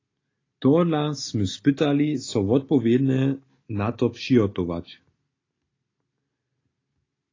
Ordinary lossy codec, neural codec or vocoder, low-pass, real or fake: AAC, 32 kbps; none; 7.2 kHz; real